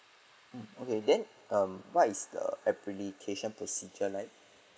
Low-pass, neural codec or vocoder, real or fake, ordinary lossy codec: none; none; real; none